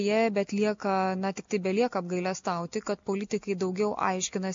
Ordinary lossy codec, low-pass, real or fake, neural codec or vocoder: MP3, 48 kbps; 7.2 kHz; real; none